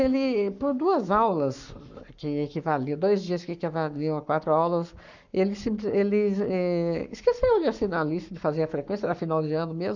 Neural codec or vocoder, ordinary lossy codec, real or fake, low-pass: codec, 44.1 kHz, 7.8 kbps, Pupu-Codec; none; fake; 7.2 kHz